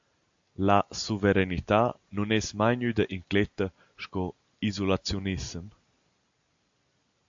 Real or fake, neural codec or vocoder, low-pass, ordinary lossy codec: real; none; 7.2 kHz; AAC, 64 kbps